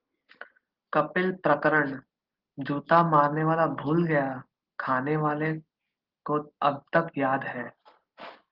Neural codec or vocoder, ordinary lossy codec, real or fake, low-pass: none; Opus, 16 kbps; real; 5.4 kHz